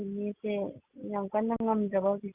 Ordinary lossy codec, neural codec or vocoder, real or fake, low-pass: Opus, 64 kbps; none; real; 3.6 kHz